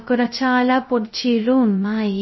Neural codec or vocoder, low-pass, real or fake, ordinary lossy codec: codec, 16 kHz, 0.2 kbps, FocalCodec; 7.2 kHz; fake; MP3, 24 kbps